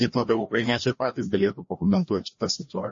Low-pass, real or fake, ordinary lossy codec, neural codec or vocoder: 7.2 kHz; fake; MP3, 32 kbps; codec, 16 kHz, 1 kbps, FreqCodec, larger model